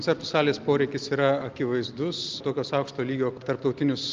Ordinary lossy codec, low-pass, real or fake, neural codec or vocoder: Opus, 24 kbps; 7.2 kHz; real; none